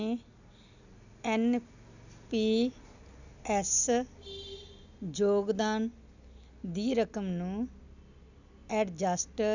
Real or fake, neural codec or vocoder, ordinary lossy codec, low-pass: real; none; none; 7.2 kHz